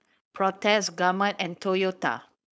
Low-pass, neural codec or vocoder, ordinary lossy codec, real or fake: none; codec, 16 kHz, 4.8 kbps, FACodec; none; fake